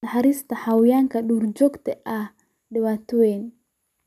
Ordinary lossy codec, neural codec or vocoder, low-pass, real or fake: none; none; 14.4 kHz; real